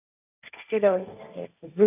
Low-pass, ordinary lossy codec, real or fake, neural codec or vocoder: 3.6 kHz; none; fake; codec, 16 kHz, 1.1 kbps, Voila-Tokenizer